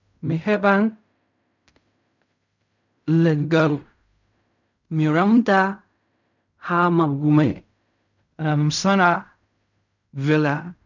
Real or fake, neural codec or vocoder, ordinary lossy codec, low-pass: fake; codec, 16 kHz in and 24 kHz out, 0.4 kbps, LongCat-Audio-Codec, fine tuned four codebook decoder; none; 7.2 kHz